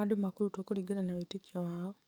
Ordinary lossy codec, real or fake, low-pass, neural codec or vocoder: none; fake; none; codec, 44.1 kHz, 7.8 kbps, DAC